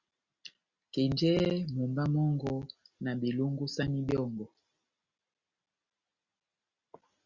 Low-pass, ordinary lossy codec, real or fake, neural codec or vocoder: 7.2 kHz; Opus, 64 kbps; real; none